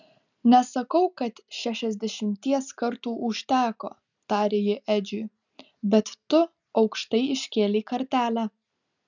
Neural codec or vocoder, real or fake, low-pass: none; real; 7.2 kHz